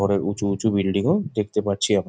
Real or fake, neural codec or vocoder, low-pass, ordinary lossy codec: real; none; none; none